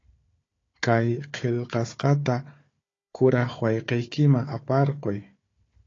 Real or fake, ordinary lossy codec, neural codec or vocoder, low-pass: fake; AAC, 32 kbps; codec, 16 kHz, 4 kbps, FunCodec, trained on Chinese and English, 50 frames a second; 7.2 kHz